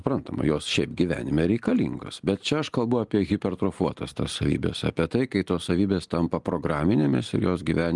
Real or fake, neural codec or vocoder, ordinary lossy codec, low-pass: real; none; Opus, 24 kbps; 10.8 kHz